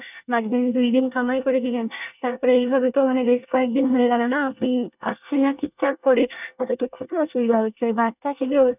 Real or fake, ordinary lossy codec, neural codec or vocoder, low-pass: fake; none; codec, 24 kHz, 1 kbps, SNAC; 3.6 kHz